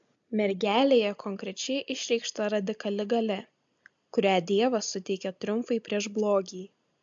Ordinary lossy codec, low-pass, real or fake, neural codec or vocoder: AAC, 64 kbps; 7.2 kHz; real; none